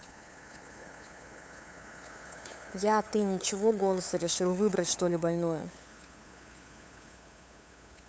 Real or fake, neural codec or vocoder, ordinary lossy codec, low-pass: fake; codec, 16 kHz, 8 kbps, FunCodec, trained on LibriTTS, 25 frames a second; none; none